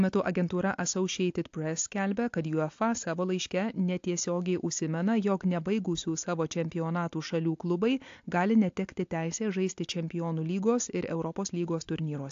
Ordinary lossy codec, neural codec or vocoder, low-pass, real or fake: AAC, 48 kbps; none; 7.2 kHz; real